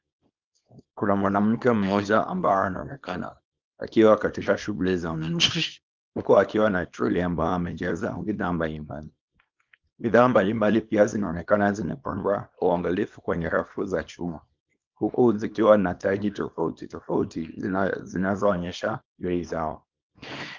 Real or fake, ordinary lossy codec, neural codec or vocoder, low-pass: fake; Opus, 32 kbps; codec, 24 kHz, 0.9 kbps, WavTokenizer, small release; 7.2 kHz